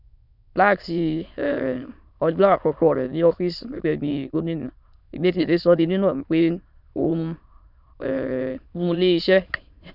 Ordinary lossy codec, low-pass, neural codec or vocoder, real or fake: none; 5.4 kHz; autoencoder, 22.05 kHz, a latent of 192 numbers a frame, VITS, trained on many speakers; fake